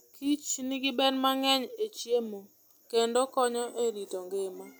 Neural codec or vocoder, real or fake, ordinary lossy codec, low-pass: none; real; none; none